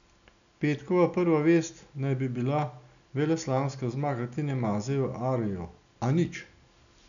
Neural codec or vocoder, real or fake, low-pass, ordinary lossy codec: none; real; 7.2 kHz; none